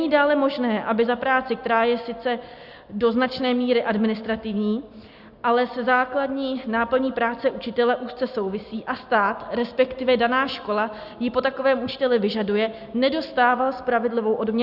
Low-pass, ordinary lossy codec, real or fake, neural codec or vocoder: 5.4 kHz; Opus, 64 kbps; real; none